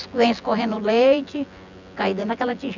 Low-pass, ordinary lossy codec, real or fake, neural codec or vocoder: 7.2 kHz; none; fake; vocoder, 24 kHz, 100 mel bands, Vocos